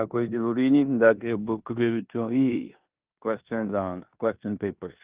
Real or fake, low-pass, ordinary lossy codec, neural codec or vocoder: fake; 3.6 kHz; Opus, 24 kbps; codec, 16 kHz in and 24 kHz out, 0.9 kbps, LongCat-Audio-Codec, four codebook decoder